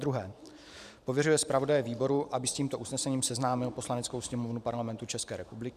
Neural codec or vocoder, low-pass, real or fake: vocoder, 44.1 kHz, 128 mel bands every 512 samples, BigVGAN v2; 14.4 kHz; fake